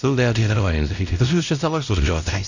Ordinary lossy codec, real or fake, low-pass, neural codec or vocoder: none; fake; 7.2 kHz; codec, 16 kHz, 0.5 kbps, X-Codec, WavLM features, trained on Multilingual LibriSpeech